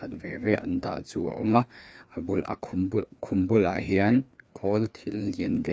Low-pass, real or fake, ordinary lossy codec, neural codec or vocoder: none; fake; none; codec, 16 kHz, 2 kbps, FreqCodec, larger model